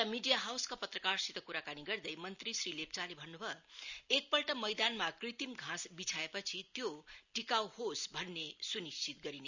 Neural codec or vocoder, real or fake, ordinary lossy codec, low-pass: none; real; none; 7.2 kHz